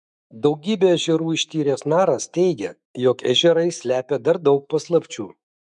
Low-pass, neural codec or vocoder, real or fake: 10.8 kHz; autoencoder, 48 kHz, 128 numbers a frame, DAC-VAE, trained on Japanese speech; fake